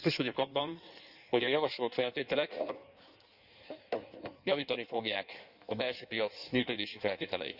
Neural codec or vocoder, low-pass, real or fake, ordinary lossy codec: codec, 16 kHz in and 24 kHz out, 1.1 kbps, FireRedTTS-2 codec; 5.4 kHz; fake; none